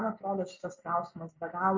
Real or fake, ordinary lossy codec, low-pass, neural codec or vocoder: real; MP3, 64 kbps; 7.2 kHz; none